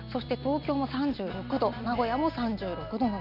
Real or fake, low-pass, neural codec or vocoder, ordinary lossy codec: real; 5.4 kHz; none; none